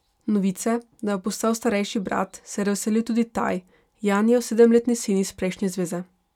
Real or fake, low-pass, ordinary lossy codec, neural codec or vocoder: real; 19.8 kHz; none; none